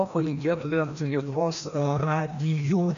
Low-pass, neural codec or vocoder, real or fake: 7.2 kHz; codec, 16 kHz, 1 kbps, FreqCodec, larger model; fake